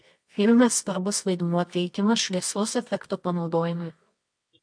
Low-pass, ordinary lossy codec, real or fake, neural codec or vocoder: 9.9 kHz; MP3, 64 kbps; fake; codec, 24 kHz, 0.9 kbps, WavTokenizer, medium music audio release